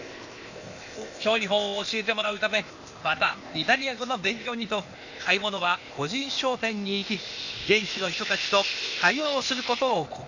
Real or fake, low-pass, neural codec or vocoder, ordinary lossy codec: fake; 7.2 kHz; codec, 16 kHz, 0.8 kbps, ZipCodec; none